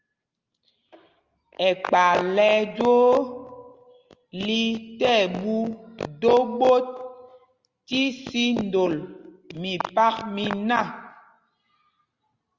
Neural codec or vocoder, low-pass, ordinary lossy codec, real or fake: none; 7.2 kHz; Opus, 32 kbps; real